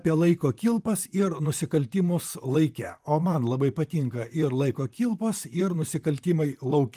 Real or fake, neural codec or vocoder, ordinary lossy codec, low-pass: fake; vocoder, 44.1 kHz, 128 mel bands, Pupu-Vocoder; Opus, 32 kbps; 14.4 kHz